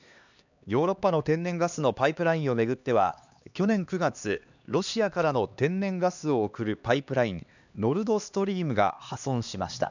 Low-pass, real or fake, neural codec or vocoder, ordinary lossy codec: 7.2 kHz; fake; codec, 16 kHz, 2 kbps, X-Codec, HuBERT features, trained on LibriSpeech; none